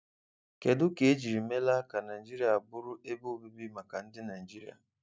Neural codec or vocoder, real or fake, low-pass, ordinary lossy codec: none; real; none; none